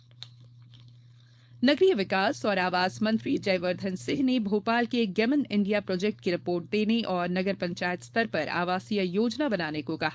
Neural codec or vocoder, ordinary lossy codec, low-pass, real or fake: codec, 16 kHz, 4.8 kbps, FACodec; none; none; fake